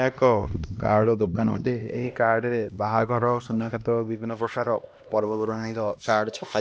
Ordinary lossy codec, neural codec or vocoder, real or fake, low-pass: none; codec, 16 kHz, 1 kbps, X-Codec, HuBERT features, trained on LibriSpeech; fake; none